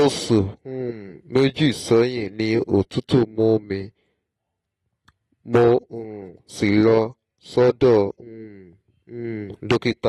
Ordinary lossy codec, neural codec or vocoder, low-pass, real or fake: AAC, 32 kbps; none; 19.8 kHz; real